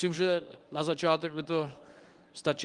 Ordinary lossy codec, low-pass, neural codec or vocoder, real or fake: Opus, 24 kbps; 10.8 kHz; codec, 24 kHz, 0.9 kbps, WavTokenizer, medium speech release version 1; fake